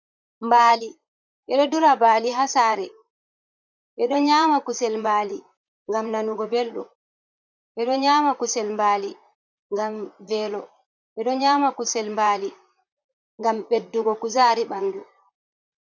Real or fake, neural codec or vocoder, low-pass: fake; vocoder, 44.1 kHz, 128 mel bands, Pupu-Vocoder; 7.2 kHz